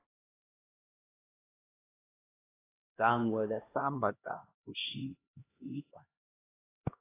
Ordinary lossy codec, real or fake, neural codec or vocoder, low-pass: AAC, 16 kbps; fake; codec, 16 kHz, 1 kbps, X-Codec, HuBERT features, trained on LibriSpeech; 3.6 kHz